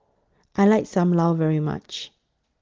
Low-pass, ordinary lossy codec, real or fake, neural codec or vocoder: 7.2 kHz; Opus, 16 kbps; real; none